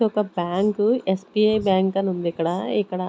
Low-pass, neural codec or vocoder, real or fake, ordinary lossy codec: none; none; real; none